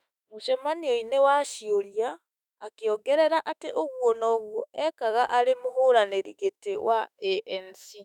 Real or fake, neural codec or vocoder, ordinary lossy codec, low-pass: fake; autoencoder, 48 kHz, 32 numbers a frame, DAC-VAE, trained on Japanese speech; none; 19.8 kHz